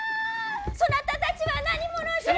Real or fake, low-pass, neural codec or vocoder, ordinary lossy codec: real; none; none; none